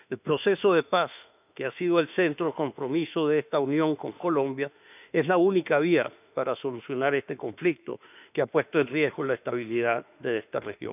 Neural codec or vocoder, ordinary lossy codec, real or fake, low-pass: autoencoder, 48 kHz, 32 numbers a frame, DAC-VAE, trained on Japanese speech; none; fake; 3.6 kHz